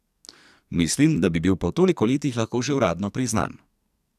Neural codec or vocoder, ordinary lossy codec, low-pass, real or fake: codec, 44.1 kHz, 2.6 kbps, SNAC; none; 14.4 kHz; fake